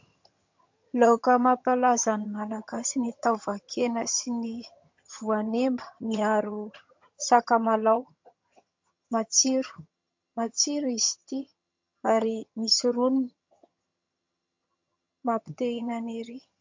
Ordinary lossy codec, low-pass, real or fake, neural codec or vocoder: MP3, 48 kbps; 7.2 kHz; fake; vocoder, 22.05 kHz, 80 mel bands, HiFi-GAN